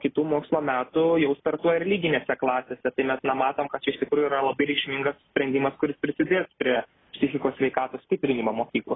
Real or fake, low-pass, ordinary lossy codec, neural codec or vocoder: real; 7.2 kHz; AAC, 16 kbps; none